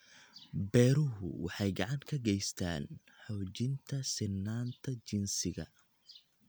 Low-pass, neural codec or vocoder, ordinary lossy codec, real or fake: none; none; none; real